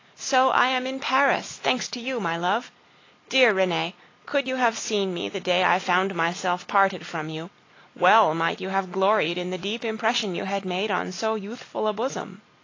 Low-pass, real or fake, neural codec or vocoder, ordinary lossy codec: 7.2 kHz; real; none; AAC, 32 kbps